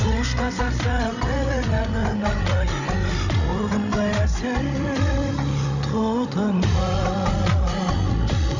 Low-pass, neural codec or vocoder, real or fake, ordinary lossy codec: 7.2 kHz; codec, 16 kHz, 16 kbps, FreqCodec, larger model; fake; none